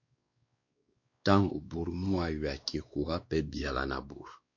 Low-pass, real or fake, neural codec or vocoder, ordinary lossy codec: 7.2 kHz; fake; codec, 16 kHz, 2 kbps, X-Codec, WavLM features, trained on Multilingual LibriSpeech; MP3, 48 kbps